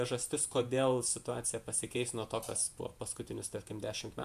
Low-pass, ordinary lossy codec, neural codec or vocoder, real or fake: 14.4 kHz; MP3, 96 kbps; vocoder, 48 kHz, 128 mel bands, Vocos; fake